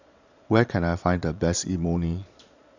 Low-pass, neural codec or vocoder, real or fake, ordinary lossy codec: 7.2 kHz; vocoder, 22.05 kHz, 80 mel bands, Vocos; fake; none